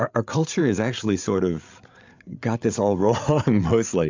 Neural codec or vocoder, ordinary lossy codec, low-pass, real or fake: codec, 16 kHz, 8 kbps, FreqCodec, larger model; MP3, 64 kbps; 7.2 kHz; fake